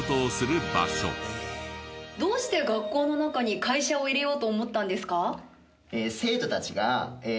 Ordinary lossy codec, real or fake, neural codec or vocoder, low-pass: none; real; none; none